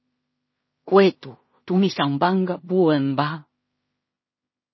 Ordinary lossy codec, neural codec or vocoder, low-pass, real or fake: MP3, 24 kbps; codec, 16 kHz in and 24 kHz out, 0.4 kbps, LongCat-Audio-Codec, two codebook decoder; 7.2 kHz; fake